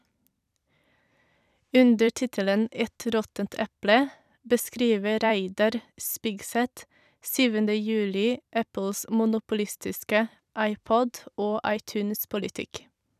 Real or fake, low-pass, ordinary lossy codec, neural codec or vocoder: fake; 14.4 kHz; none; vocoder, 44.1 kHz, 128 mel bands every 256 samples, BigVGAN v2